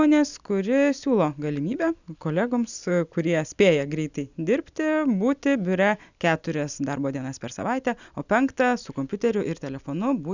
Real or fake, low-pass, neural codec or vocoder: real; 7.2 kHz; none